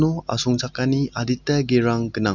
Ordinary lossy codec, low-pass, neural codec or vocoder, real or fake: none; 7.2 kHz; none; real